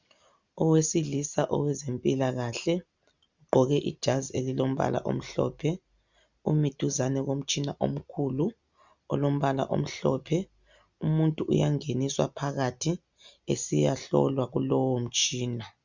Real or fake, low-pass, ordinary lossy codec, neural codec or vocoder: real; 7.2 kHz; Opus, 64 kbps; none